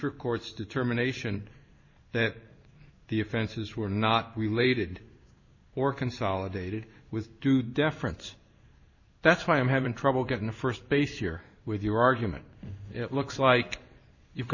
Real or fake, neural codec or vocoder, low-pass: fake; codec, 16 kHz in and 24 kHz out, 1 kbps, XY-Tokenizer; 7.2 kHz